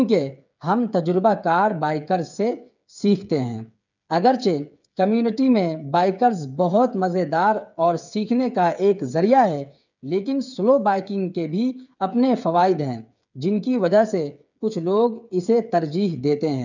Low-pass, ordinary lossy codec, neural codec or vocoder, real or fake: 7.2 kHz; none; codec, 16 kHz, 8 kbps, FreqCodec, smaller model; fake